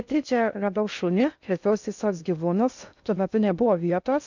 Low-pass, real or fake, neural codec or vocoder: 7.2 kHz; fake; codec, 16 kHz in and 24 kHz out, 0.6 kbps, FocalCodec, streaming, 4096 codes